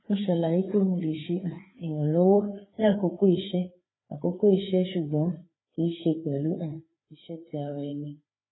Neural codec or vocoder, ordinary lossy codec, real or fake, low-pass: codec, 16 kHz, 4 kbps, FreqCodec, larger model; AAC, 16 kbps; fake; 7.2 kHz